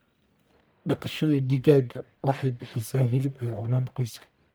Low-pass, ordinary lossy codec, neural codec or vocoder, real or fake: none; none; codec, 44.1 kHz, 1.7 kbps, Pupu-Codec; fake